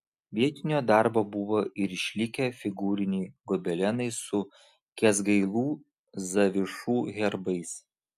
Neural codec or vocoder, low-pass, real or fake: none; 14.4 kHz; real